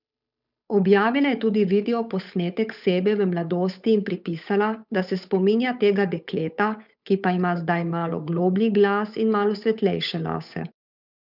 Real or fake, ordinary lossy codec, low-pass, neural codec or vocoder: fake; none; 5.4 kHz; codec, 16 kHz, 8 kbps, FunCodec, trained on Chinese and English, 25 frames a second